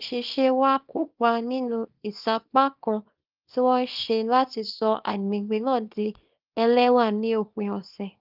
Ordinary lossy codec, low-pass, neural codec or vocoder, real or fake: Opus, 24 kbps; 5.4 kHz; codec, 24 kHz, 0.9 kbps, WavTokenizer, small release; fake